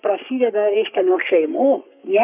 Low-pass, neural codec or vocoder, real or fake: 3.6 kHz; codec, 44.1 kHz, 3.4 kbps, Pupu-Codec; fake